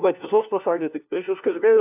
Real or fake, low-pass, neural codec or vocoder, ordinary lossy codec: fake; 3.6 kHz; codec, 16 kHz, 1 kbps, FunCodec, trained on LibriTTS, 50 frames a second; AAC, 32 kbps